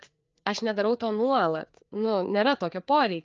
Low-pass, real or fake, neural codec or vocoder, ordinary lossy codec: 7.2 kHz; fake; codec, 16 kHz, 4 kbps, FreqCodec, larger model; Opus, 24 kbps